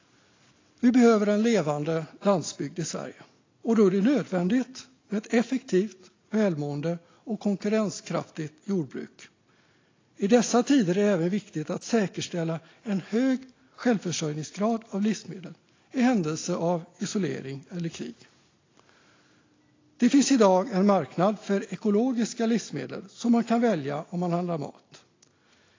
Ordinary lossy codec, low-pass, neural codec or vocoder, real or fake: AAC, 32 kbps; 7.2 kHz; none; real